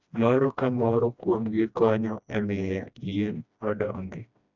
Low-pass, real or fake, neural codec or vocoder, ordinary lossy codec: 7.2 kHz; fake; codec, 16 kHz, 1 kbps, FreqCodec, smaller model; none